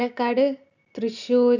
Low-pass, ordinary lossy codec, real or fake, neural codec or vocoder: 7.2 kHz; none; real; none